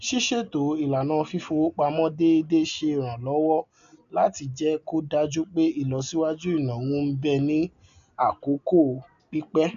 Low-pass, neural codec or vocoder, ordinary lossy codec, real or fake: 7.2 kHz; none; none; real